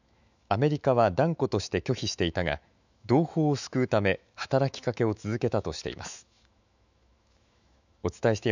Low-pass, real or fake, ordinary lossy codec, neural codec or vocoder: 7.2 kHz; fake; none; autoencoder, 48 kHz, 128 numbers a frame, DAC-VAE, trained on Japanese speech